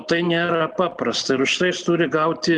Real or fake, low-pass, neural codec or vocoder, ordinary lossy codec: real; 9.9 kHz; none; Opus, 32 kbps